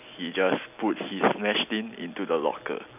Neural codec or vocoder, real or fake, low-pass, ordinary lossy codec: none; real; 3.6 kHz; none